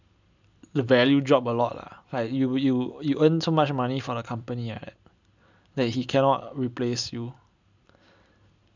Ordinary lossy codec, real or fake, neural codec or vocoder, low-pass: none; real; none; 7.2 kHz